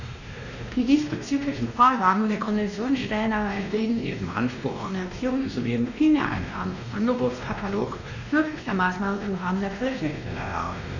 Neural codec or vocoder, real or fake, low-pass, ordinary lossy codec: codec, 16 kHz, 1 kbps, X-Codec, WavLM features, trained on Multilingual LibriSpeech; fake; 7.2 kHz; none